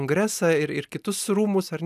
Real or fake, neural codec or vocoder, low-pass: real; none; 14.4 kHz